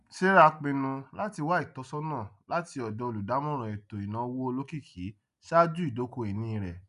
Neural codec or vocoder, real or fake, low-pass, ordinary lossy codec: none; real; 10.8 kHz; none